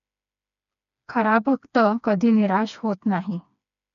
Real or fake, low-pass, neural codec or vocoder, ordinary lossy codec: fake; 7.2 kHz; codec, 16 kHz, 2 kbps, FreqCodec, smaller model; none